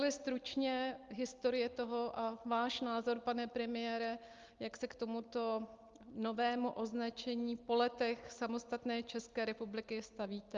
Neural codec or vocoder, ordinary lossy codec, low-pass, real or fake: none; Opus, 32 kbps; 7.2 kHz; real